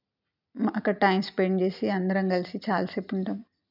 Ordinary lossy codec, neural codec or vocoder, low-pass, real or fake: none; none; 5.4 kHz; real